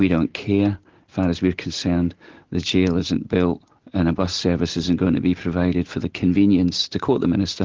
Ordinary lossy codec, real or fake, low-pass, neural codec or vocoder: Opus, 16 kbps; real; 7.2 kHz; none